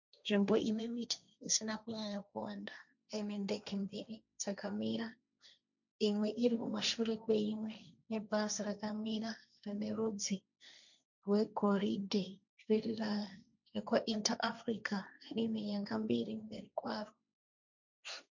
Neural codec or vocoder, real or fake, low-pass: codec, 16 kHz, 1.1 kbps, Voila-Tokenizer; fake; 7.2 kHz